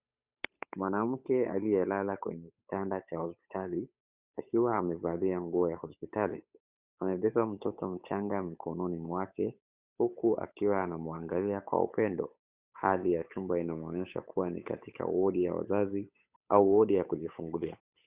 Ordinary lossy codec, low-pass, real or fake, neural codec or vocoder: Opus, 64 kbps; 3.6 kHz; fake; codec, 16 kHz, 8 kbps, FunCodec, trained on Chinese and English, 25 frames a second